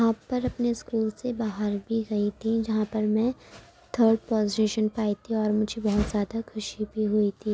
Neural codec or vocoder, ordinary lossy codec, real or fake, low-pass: none; none; real; none